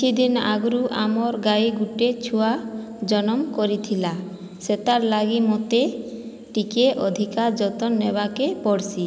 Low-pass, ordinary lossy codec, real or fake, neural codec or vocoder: none; none; real; none